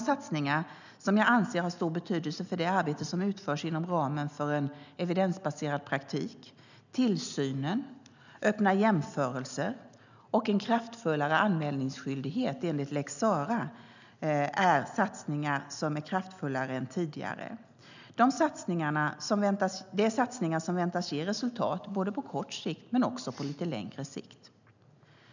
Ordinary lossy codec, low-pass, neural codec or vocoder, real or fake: none; 7.2 kHz; none; real